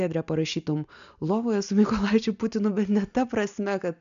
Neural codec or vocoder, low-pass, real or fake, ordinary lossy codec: none; 7.2 kHz; real; MP3, 96 kbps